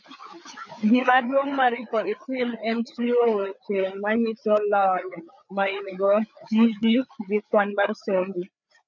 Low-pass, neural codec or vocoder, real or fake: 7.2 kHz; codec, 16 kHz, 4 kbps, FreqCodec, larger model; fake